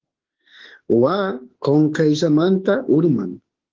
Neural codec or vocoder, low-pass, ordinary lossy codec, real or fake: autoencoder, 48 kHz, 32 numbers a frame, DAC-VAE, trained on Japanese speech; 7.2 kHz; Opus, 16 kbps; fake